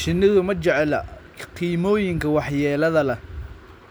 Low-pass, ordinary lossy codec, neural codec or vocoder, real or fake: none; none; none; real